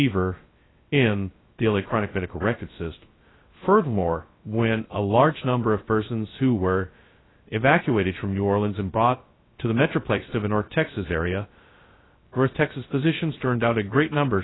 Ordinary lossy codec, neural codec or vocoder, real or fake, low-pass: AAC, 16 kbps; codec, 16 kHz, 0.2 kbps, FocalCodec; fake; 7.2 kHz